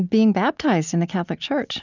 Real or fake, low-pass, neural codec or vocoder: real; 7.2 kHz; none